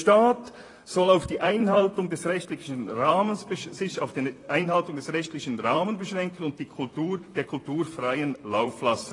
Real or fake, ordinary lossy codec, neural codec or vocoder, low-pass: fake; AAC, 32 kbps; vocoder, 44.1 kHz, 128 mel bands, Pupu-Vocoder; 10.8 kHz